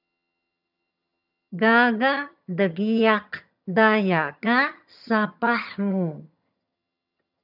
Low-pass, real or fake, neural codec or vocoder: 5.4 kHz; fake; vocoder, 22.05 kHz, 80 mel bands, HiFi-GAN